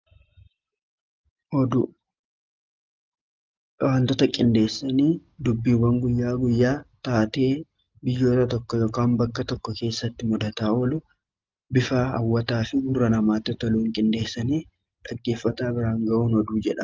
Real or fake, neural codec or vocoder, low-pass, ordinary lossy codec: real; none; 7.2 kHz; Opus, 24 kbps